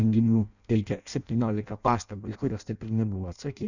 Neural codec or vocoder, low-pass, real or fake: codec, 16 kHz in and 24 kHz out, 0.6 kbps, FireRedTTS-2 codec; 7.2 kHz; fake